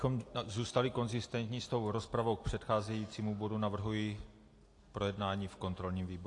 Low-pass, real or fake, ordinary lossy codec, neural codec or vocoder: 10.8 kHz; real; AAC, 48 kbps; none